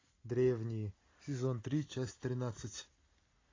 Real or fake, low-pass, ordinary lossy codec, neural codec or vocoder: real; 7.2 kHz; AAC, 32 kbps; none